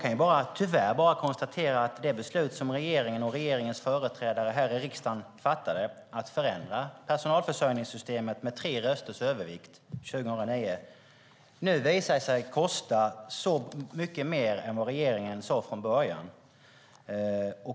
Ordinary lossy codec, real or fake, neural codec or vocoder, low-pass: none; real; none; none